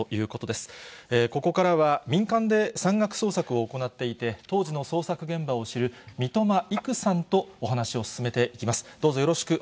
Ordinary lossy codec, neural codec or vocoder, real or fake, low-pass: none; none; real; none